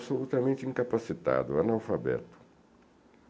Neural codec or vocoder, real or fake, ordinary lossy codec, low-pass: none; real; none; none